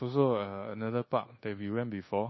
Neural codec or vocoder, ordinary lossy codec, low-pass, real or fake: codec, 24 kHz, 1.2 kbps, DualCodec; MP3, 24 kbps; 7.2 kHz; fake